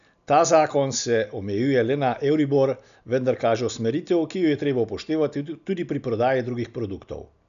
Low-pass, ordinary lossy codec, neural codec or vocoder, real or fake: 7.2 kHz; none; none; real